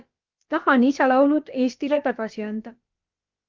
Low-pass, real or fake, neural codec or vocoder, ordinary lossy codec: 7.2 kHz; fake; codec, 16 kHz, about 1 kbps, DyCAST, with the encoder's durations; Opus, 24 kbps